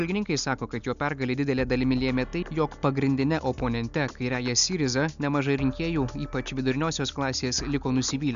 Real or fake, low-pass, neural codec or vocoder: real; 7.2 kHz; none